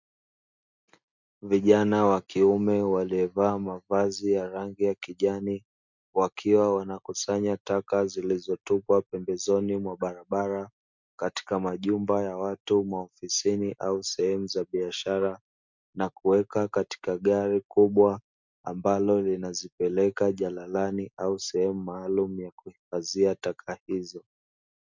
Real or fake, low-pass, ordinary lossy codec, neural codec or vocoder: real; 7.2 kHz; MP3, 64 kbps; none